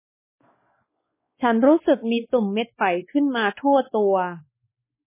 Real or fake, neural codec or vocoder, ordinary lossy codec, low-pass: fake; codec, 16 kHz, 2 kbps, X-Codec, WavLM features, trained on Multilingual LibriSpeech; MP3, 16 kbps; 3.6 kHz